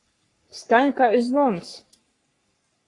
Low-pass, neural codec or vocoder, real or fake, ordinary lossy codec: 10.8 kHz; codec, 44.1 kHz, 3.4 kbps, Pupu-Codec; fake; AAC, 32 kbps